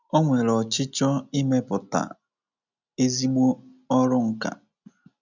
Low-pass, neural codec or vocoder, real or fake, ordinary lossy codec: 7.2 kHz; none; real; none